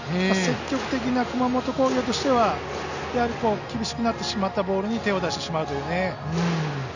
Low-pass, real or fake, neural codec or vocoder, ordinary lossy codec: 7.2 kHz; real; none; none